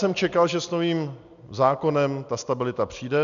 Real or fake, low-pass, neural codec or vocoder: real; 7.2 kHz; none